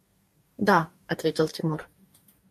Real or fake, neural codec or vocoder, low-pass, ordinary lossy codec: fake; codec, 44.1 kHz, 2.6 kbps, DAC; 14.4 kHz; MP3, 64 kbps